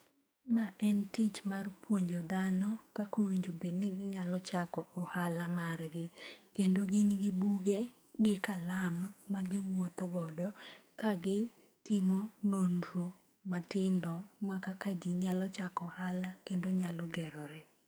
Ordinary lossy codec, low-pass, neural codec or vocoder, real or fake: none; none; codec, 44.1 kHz, 2.6 kbps, SNAC; fake